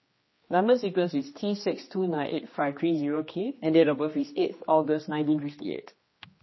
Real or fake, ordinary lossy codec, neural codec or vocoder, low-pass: fake; MP3, 24 kbps; codec, 16 kHz, 2 kbps, X-Codec, HuBERT features, trained on general audio; 7.2 kHz